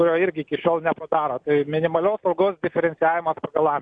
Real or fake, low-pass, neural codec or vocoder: real; 9.9 kHz; none